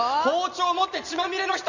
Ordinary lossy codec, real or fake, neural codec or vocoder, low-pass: Opus, 64 kbps; real; none; 7.2 kHz